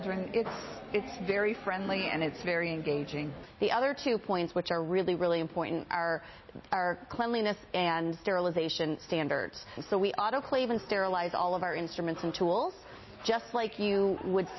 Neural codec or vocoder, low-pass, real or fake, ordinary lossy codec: none; 7.2 kHz; real; MP3, 24 kbps